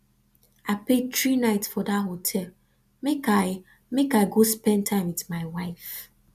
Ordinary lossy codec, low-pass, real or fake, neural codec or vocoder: none; 14.4 kHz; real; none